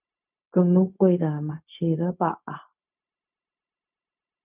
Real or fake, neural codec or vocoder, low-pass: fake; codec, 16 kHz, 0.4 kbps, LongCat-Audio-Codec; 3.6 kHz